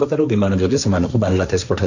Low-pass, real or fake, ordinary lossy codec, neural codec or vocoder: 7.2 kHz; fake; none; codec, 16 kHz, 1.1 kbps, Voila-Tokenizer